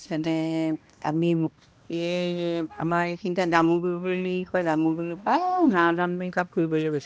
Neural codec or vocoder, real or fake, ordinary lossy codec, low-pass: codec, 16 kHz, 1 kbps, X-Codec, HuBERT features, trained on balanced general audio; fake; none; none